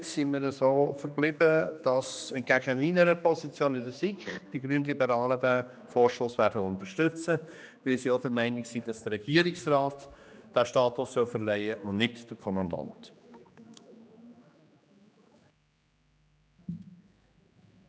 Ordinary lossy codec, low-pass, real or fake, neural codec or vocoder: none; none; fake; codec, 16 kHz, 2 kbps, X-Codec, HuBERT features, trained on general audio